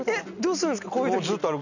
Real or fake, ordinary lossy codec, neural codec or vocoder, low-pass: real; none; none; 7.2 kHz